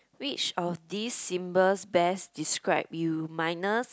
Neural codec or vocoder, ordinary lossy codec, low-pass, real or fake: none; none; none; real